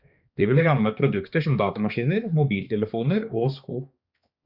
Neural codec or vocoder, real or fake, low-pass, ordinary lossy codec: codec, 16 kHz, 2 kbps, X-Codec, HuBERT features, trained on balanced general audio; fake; 5.4 kHz; Opus, 64 kbps